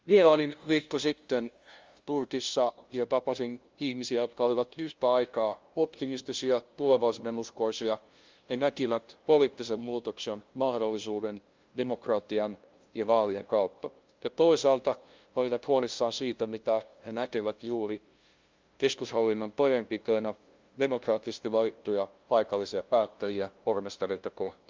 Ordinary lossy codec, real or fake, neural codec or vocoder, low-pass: Opus, 32 kbps; fake; codec, 16 kHz, 0.5 kbps, FunCodec, trained on LibriTTS, 25 frames a second; 7.2 kHz